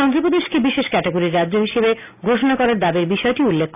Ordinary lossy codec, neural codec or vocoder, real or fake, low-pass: none; none; real; 3.6 kHz